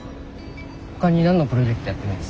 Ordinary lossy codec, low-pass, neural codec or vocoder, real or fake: none; none; none; real